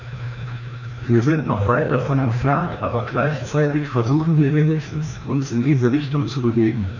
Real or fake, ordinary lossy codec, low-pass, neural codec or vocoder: fake; none; 7.2 kHz; codec, 16 kHz, 1 kbps, FreqCodec, larger model